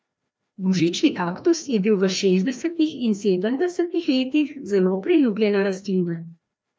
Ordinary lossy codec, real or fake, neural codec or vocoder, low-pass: none; fake; codec, 16 kHz, 1 kbps, FreqCodec, larger model; none